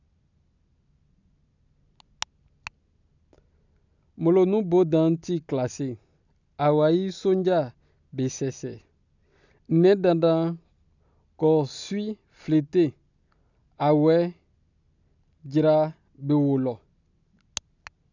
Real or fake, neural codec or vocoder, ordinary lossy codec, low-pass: real; none; none; 7.2 kHz